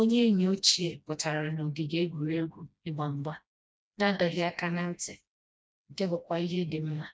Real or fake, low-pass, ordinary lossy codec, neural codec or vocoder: fake; none; none; codec, 16 kHz, 1 kbps, FreqCodec, smaller model